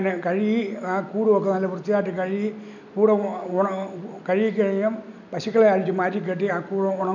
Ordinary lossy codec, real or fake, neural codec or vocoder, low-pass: none; real; none; 7.2 kHz